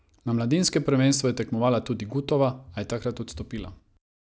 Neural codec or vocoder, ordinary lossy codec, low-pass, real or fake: none; none; none; real